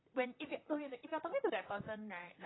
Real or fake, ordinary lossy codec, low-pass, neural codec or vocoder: fake; AAC, 16 kbps; 7.2 kHz; vocoder, 44.1 kHz, 128 mel bands, Pupu-Vocoder